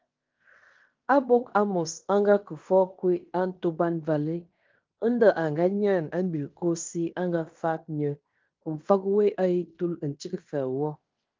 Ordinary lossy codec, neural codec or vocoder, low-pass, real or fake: Opus, 32 kbps; codec, 16 kHz in and 24 kHz out, 0.9 kbps, LongCat-Audio-Codec, fine tuned four codebook decoder; 7.2 kHz; fake